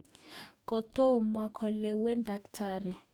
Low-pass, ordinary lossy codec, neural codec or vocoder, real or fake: 19.8 kHz; none; codec, 44.1 kHz, 2.6 kbps, DAC; fake